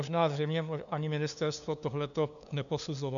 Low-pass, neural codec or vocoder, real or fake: 7.2 kHz; codec, 16 kHz, 2 kbps, FunCodec, trained on LibriTTS, 25 frames a second; fake